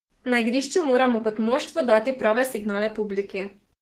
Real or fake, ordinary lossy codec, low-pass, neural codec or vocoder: fake; Opus, 16 kbps; 14.4 kHz; codec, 32 kHz, 1.9 kbps, SNAC